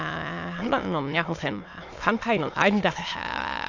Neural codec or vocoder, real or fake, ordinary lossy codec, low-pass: autoencoder, 22.05 kHz, a latent of 192 numbers a frame, VITS, trained on many speakers; fake; AAC, 48 kbps; 7.2 kHz